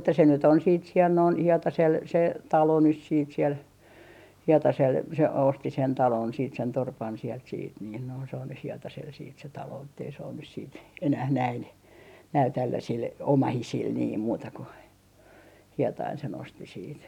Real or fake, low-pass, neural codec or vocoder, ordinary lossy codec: real; 19.8 kHz; none; none